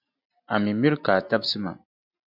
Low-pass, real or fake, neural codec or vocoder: 5.4 kHz; real; none